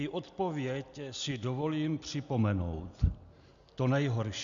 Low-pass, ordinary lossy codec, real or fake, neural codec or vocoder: 7.2 kHz; MP3, 96 kbps; real; none